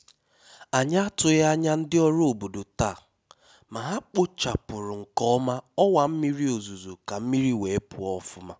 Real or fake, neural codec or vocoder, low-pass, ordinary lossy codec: real; none; none; none